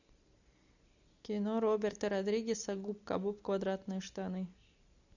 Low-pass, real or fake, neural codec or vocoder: 7.2 kHz; real; none